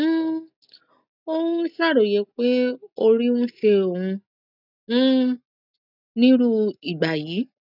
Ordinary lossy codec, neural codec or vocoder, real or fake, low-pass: none; none; real; 5.4 kHz